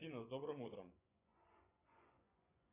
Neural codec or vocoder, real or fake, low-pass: vocoder, 24 kHz, 100 mel bands, Vocos; fake; 3.6 kHz